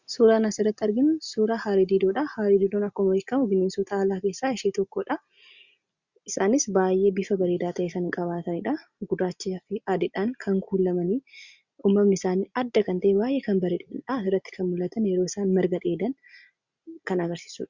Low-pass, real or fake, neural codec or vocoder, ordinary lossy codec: 7.2 kHz; real; none; Opus, 64 kbps